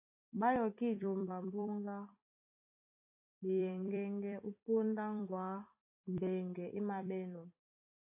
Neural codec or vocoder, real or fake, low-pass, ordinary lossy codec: vocoder, 44.1 kHz, 128 mel bands every 256 samples, BigVGAN v2; fake; 3.6 kHz; AAC, 32 kbps